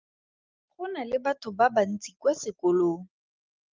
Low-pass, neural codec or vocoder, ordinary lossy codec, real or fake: 7.2 kHz; none; Opus, 24 kbps; real